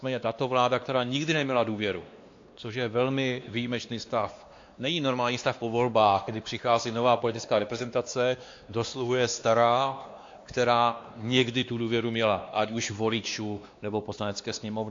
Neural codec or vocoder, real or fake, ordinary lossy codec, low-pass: codec, 16 kHz, 2 kbps, X-Codec, WavLM features, trained on Multilingual LibriSpeech; fake; AAC, 48 kbps; 7.2 kHz